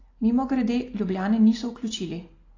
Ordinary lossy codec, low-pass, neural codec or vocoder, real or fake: AAC, 32 kbps; 7.2 kHz; none; real